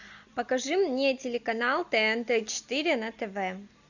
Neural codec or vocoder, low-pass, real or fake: none; 7.2 kHz; real